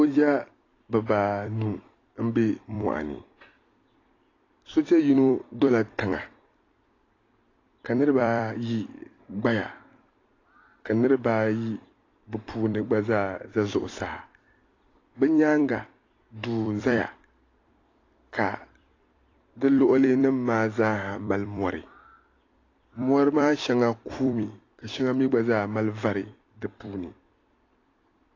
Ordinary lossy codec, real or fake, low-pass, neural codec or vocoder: AAC, 32 kbps; fake; 7.2 kHz; vocoder, 44.1 kHz, 128 mel bands every 256 samples, BigVGAN v2